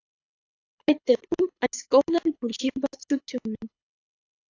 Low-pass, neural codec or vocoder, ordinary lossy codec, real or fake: 7.2 kHz; codec, 24 kHz, 6 kbps, HILCodec; AAC, 48 kbps; fake